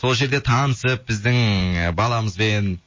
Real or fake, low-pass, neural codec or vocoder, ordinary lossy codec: real; 7.2 kHz; none; MP3, 32 kbps